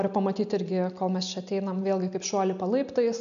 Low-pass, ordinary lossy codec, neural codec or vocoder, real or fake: 7.2 kHz; AAC, 96 kbps; none; real